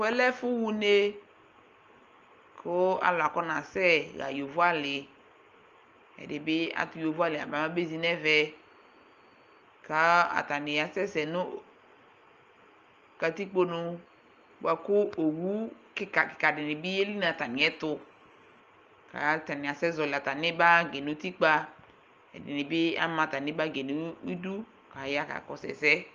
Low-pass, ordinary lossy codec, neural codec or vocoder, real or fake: 7.2 kHz; Opus, 24 kbps; none; real